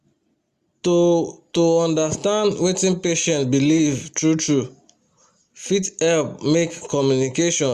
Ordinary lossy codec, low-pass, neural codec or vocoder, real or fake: Opus, 64 kbps; 14.4 kHz; none; real